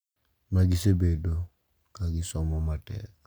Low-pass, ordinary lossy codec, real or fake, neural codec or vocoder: none; none; real; none